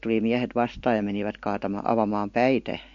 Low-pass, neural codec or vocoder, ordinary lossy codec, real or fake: 7.2 kHz; none; MP3, 48 kbps; real